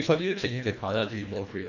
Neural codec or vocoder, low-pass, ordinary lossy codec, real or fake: codec, 24 kHz, 1.5 kbps, HILCodec; 7.2 kHz; none; fake